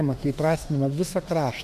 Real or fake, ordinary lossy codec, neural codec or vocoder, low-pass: fake; MP3, 96 kbps; autoencoder, 48 kHz, 32 numbers a frame, DAC-VAE, trained on Japanese speech; 14.4 kHz